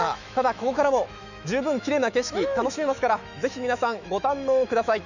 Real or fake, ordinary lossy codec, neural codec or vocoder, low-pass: fake; none; autoencoder, 48 kHz, 128 numbers a frame, DAC-VAE, trained on Japanese speech; 7.2 kHz